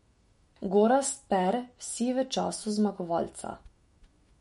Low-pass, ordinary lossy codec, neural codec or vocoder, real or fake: 19.8 kHz; MP3, 48 kbps; autoencoder, 48 kHz, 128 numbers a frame, DAC-VAE, trained on Japanese speech; fake